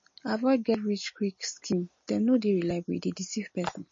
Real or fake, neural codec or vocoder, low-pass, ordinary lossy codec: real; none; 7.2 kHz; MP3, 32 kbps